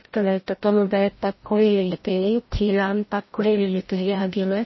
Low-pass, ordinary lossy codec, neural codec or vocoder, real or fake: 7.2 kHz; MP3, 24 kbps; codec, 16 kHz, 0.5 kbps, FreqCodec, larger model; fake